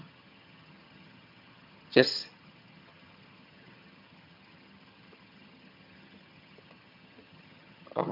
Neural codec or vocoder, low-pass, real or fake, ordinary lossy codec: vocoder, 22.05 kHz, 80 mel bands, HiFi-GAN; 5.4 kHz; fake; MP3, 48 kbps